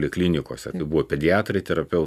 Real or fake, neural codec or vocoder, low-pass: real; none; 14.4 kHz